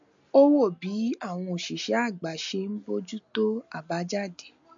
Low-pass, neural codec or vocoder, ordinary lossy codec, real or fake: 7.2 kHz; none; MP3, 48 kbps; real